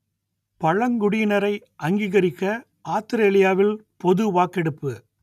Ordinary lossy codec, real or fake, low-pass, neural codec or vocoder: none; real; 14.4 kHz; none